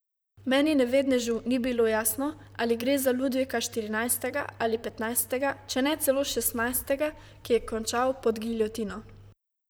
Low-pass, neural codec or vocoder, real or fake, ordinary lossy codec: none; vocoder, 44.1 kHz, 128 mel bands, Pupu-Vocoder; fake; none